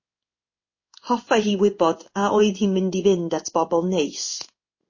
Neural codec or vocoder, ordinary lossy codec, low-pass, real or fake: codec, 16 kHz in and 24 kHz out, 1 kbps, XY-Tokenizer; MP3, 32 kbps; 7.2 kHz; fake